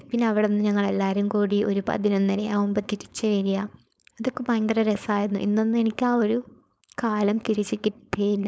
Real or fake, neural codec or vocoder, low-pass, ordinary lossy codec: fake; codec, 16 kHz, 4.8 kbps, FACodec; none; none